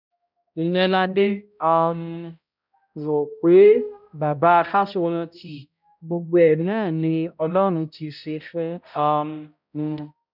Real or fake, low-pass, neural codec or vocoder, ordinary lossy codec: fake; 5.4 kHz; codec, 16 kHz, 0.5 kbps, X-Codec, HuBERT features, trained on balanced general audio; none